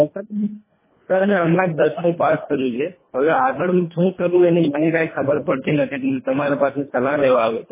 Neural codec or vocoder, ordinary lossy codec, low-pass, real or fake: codec, 24 kHz, 1.5 kbps, HILCodec; MP3, 16 kbps; 3.6 kHz; fake